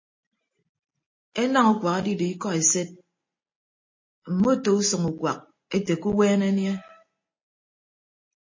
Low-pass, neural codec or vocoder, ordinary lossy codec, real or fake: 7.2 kHz; none; MP3, 32 kbps; real